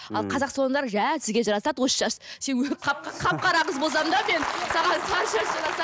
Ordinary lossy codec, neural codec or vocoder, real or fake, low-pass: none; none; real; none